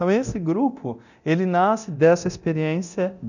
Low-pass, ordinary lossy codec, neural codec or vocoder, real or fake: 7.2 kHz; none; codec, 24 kHz, 0.9 kbps, DualCodec; fake